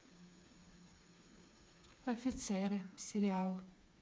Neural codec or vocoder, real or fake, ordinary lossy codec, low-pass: codec, 16 kHz, 4 kbps, FreqCodec, smaller model; fake; none; none